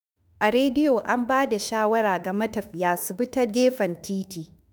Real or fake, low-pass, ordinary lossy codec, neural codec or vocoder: fake; none; none; autoencoder, 48 kHz, 32 numbers a frame, DAC-VAE, trained on Japanese speech